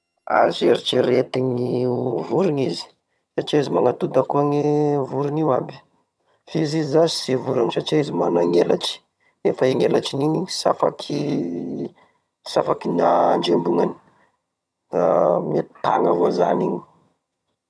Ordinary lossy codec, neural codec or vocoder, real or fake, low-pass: none; vocoder, 22.05 kHz, 80 mel bands, HiFi-GAN; fake; none